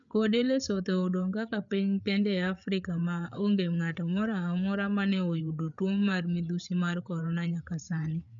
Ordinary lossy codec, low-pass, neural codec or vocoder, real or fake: none; 7.2 kHz; codec, 16 kHz, 8 kbps, FreqCodec, larger model; fake